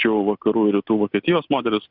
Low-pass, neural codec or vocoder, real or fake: 5.4 kHz; none; real